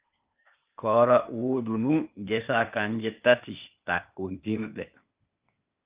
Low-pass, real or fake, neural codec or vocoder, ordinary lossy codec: 3.6 kHz; fake; codec, 16 kHz, 0.8 kbps, ZipCodec; Opus, 24 kbps